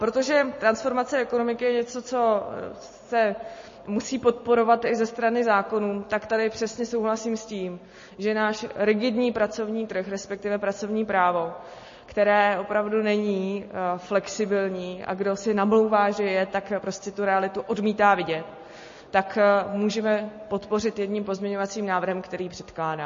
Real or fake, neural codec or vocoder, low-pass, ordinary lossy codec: real; none; 7.2 kHz; MP3, 32 kbps